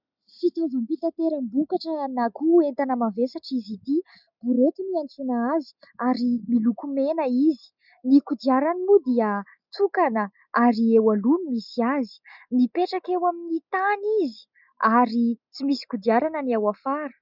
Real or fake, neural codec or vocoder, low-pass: real; none; 5.4 kHz